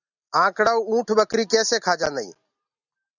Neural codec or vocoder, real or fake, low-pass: none; real; 7.2 kHz